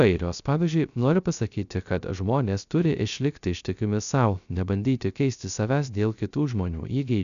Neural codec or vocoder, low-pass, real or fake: codec, 16 kHz, 0.3 kbps, FocalCodec; 7.2 kHz; fake